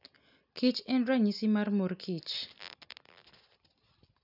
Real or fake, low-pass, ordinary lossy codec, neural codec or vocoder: real; 5.4 kHz; none; none